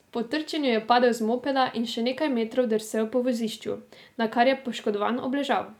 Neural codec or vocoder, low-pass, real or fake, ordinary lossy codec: none; 19.8 kHz; real; none